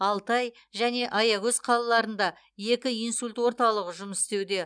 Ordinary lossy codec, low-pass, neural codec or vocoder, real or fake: none; 9.9 kHz; none; real